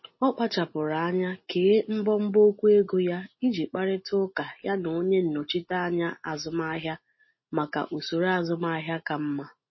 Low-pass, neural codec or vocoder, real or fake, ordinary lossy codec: 7.2 kHz; none; real; MP3, 24 kbps